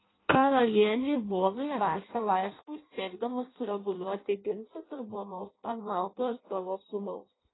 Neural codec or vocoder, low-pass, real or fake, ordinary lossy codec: codec, 16 kHz in and 24 kHz out, 0.6 kbps, FireRedTTS-2 codec; 7.2 kHz; fake; AAC, 16 kbps